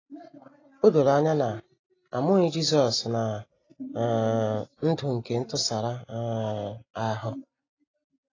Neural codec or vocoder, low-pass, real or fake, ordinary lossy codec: none; 7.2 kHz; real; AAC, 32 kbps